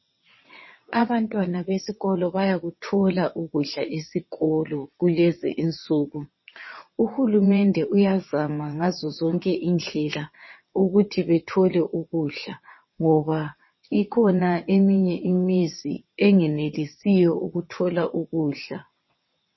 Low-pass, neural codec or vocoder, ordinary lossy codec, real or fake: 7.2 kHz; vocoder, 22.05 kHz, 80 mel bands, WaveNeXt; MP3, 24 kbps; fake